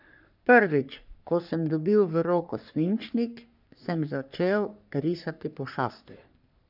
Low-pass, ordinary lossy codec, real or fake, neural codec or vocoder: 5.4 kHz; none; fake; codec, 44.1 kHz, 3.4 kbps, Pupu-Codec